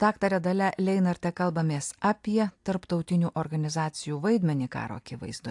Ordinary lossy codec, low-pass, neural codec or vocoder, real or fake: AAC, 64 kbps; 10.8 kHz; none; real